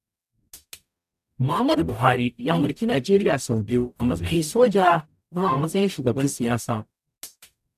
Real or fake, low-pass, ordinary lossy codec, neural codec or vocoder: fake; 14.4 kHz; none; codec, 44.1 kHz, 0.9 kbps, DAC